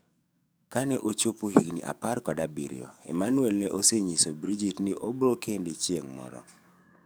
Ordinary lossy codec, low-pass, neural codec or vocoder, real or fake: none; none; codec, 44.1 kHz, 7.8 kbps, DAC; fake